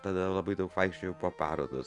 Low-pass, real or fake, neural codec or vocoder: 10.8 kHz; real; none